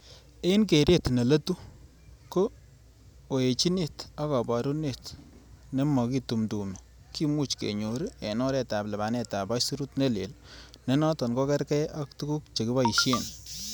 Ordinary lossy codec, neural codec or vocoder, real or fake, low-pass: none; none; real; none